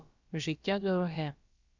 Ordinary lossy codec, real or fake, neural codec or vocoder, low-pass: Opus, 64 kbps; fake; codec, 16 kHz, about 1 kbps, DyCAST, with the encoder's durations; 7.2 kHz